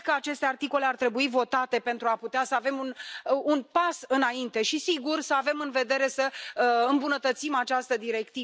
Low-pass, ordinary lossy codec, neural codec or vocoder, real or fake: none; none; none; real